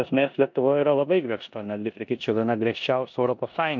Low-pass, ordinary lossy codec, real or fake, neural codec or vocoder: 7.2 kHz; MP3, 64 kbps; fake; codec, 16 kHz in and 24 kHz out, 0.9 kbps, LongCat-Audio-Codec, four codebook decoder